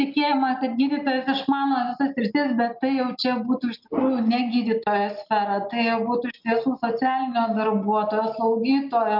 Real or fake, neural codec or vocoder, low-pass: real; none; 5.4 kHz